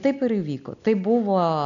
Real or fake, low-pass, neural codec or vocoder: real; 7.2 kHz; none